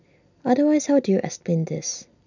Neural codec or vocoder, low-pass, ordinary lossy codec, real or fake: none; 7.2 kHz; none; real